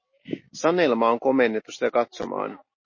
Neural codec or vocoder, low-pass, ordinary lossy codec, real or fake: none; 7.2 kHz; MP3, 32 kbps; real